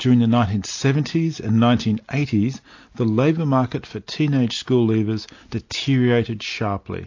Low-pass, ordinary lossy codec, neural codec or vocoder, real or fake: 7.2 kHz; AAC, 48 kbps; none; real